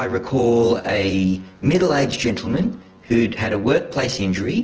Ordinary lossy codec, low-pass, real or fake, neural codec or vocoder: Opus, 16 kbps; 7.2 kHz; fake; vocoder, 24 kHz, 100 mel bands, Vocos